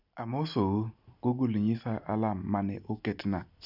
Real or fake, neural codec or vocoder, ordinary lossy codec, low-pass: real; none; none; 5.4 kHz